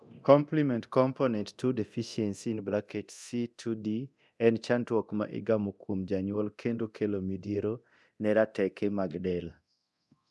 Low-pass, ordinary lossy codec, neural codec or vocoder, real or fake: none; none; codec, 24 kHz, 0.9 kbps, DualCodec; fake